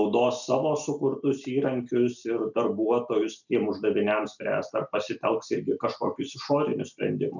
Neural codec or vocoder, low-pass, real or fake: none; 7.2 kHz; real